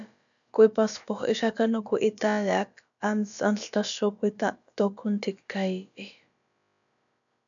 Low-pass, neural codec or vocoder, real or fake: 7.2 kHz; codec, 16 kHz, about 1 kbps, DyCAST, with the encoder's durations; fake